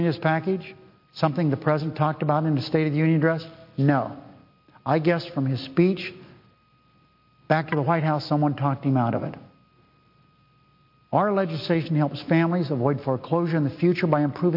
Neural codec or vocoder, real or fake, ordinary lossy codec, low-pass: none; real; MP3, 32 kbps; 5.4 kHz